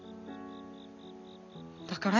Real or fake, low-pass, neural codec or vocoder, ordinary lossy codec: real; 7.2 kHz; none; none